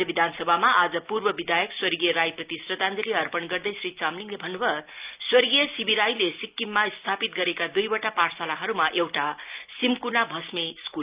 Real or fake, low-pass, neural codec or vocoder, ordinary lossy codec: real; 3.6 kHz; none; Opus, 24 kbps